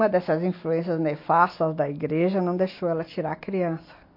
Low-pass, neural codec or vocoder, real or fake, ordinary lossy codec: 5.4 kHz; none; real; MP3, 32 kbps